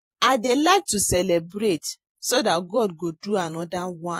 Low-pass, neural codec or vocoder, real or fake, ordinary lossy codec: 19.8 kHz; vocoder, 48 kHz, 128 mel bands, Vocos; fake; AAC, 48 kbps